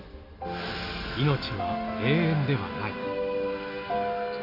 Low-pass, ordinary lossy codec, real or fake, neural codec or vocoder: 5.4 kHz; none; real; none